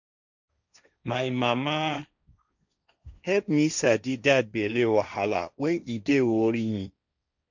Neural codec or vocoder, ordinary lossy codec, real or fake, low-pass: codec, 16 kHz, 1.1 kbps, Voila-Tokenizer; none; fake; 7.2 kHz